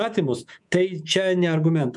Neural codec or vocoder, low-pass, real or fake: codec, 24 kHz, 3.1 kbps, DualCodec; 10.8 kHz; fake